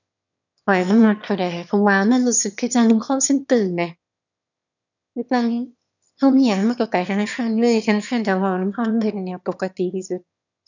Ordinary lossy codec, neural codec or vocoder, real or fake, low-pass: none; autoencoder, 22.05 kHz, a latent of 192 numbers a frame, VITS, trained on one speaker; fake; 7.2 kHz